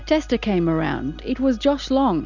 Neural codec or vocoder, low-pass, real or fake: none; 7.2 kHz; real